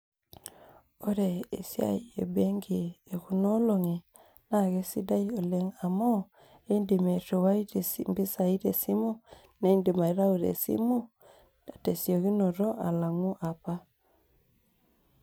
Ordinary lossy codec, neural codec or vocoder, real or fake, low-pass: none; none; real; none